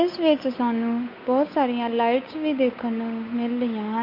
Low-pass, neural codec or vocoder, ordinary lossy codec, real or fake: 5.4 kHz; codec, 16 kHz, 8 kbps, FunCodec, trained on Chinese and English, 25 frames a second; MP3, 32 kbps; fake